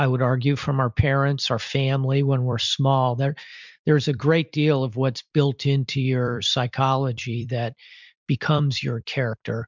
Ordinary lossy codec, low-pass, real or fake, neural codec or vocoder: MP3, 64 kbps; 7.2 kHz; fake; vocoder, 44.1 kHz, 128 mel bands every 256 samples, BigVGAN v2